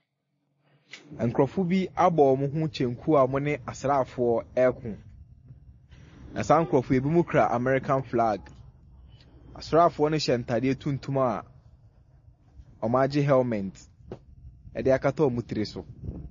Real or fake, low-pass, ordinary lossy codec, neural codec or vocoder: real; 7.2 kHz; MP3, 32 kbps; none